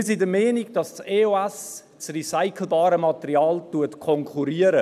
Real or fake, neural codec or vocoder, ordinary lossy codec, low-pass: real; none; none; 14.4 kHz